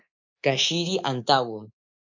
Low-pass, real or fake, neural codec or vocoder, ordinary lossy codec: 7.2 kHz; fake; codec, 24 kHz, 3.1 kbps, DualCodec; AAC, 48 kbps